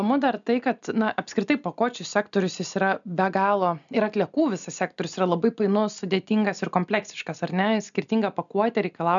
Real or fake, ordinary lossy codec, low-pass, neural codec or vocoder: real; MP3, 96 kbps; 7.2 kHz; none